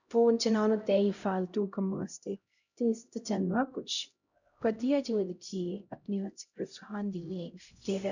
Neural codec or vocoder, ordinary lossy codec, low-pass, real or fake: codec, 16 kHz, 0.5 kbps, X-Codec, HuBERT features, trained on LibriSpeech; none; 7.2 kHz; fake